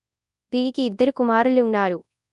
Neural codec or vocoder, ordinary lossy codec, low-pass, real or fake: codec, 24 kHz, 0.9 kbps, WavTokenizer, large speech release; none; 10.8 kHz; fake